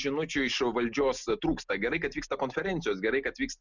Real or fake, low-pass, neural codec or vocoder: real; 7.2 kHz; none